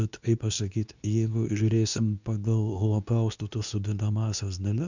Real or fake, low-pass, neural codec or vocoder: fake; 7.2 kHz; codec, 24 kHz, 0.9 kbps, WavTokenizer, medium speech release version 2